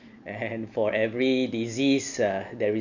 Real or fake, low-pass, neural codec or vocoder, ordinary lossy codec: real; 7.2 kHz; none; none